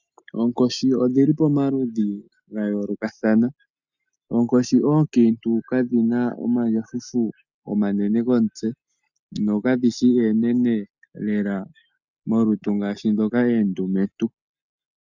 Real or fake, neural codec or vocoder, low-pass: real; none; 7.2 kHz